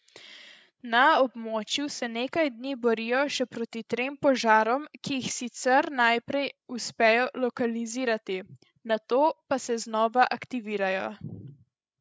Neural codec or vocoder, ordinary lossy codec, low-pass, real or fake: codec, 16 kHz, 16 kbps, FreqCodec, larger model; none; none; fake